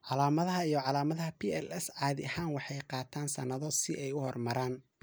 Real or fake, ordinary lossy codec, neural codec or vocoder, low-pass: real; none; none; none